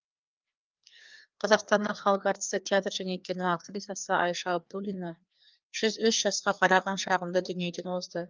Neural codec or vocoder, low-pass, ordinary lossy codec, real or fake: codec, 16 kHz, 2 kbps, FreqCodec, larger model; 7.2 kHz; Opus, 24 kbps; fake